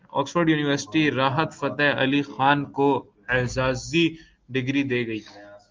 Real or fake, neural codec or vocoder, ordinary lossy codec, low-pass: real; none; Opus, 32 kbps; 7.2 kHz